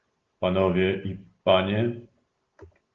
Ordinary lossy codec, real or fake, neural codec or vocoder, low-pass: Opus, 16 kbps; real; none; 7.2 kHz